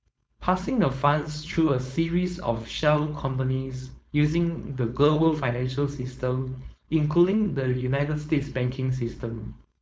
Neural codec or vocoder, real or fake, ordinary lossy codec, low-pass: codec, 16 kHz, 4.8 kbps, FACodec; fake; none; none